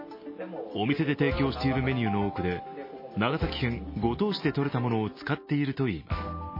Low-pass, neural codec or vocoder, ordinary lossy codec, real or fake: 5.4 kHz; none; MP3, 24 kbps; real